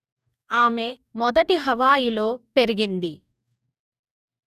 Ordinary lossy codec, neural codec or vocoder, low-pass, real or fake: none; codec, 44.1 kHz, 2.6 kbps, DAC; 14.4 kHz; fake